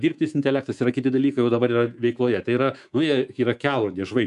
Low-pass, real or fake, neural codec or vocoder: 10.8 kHz; fake; codec, 24 kHz, 3.1 kbps, DualCodec